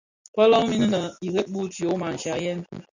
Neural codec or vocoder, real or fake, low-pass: none; real; 7.2 kHz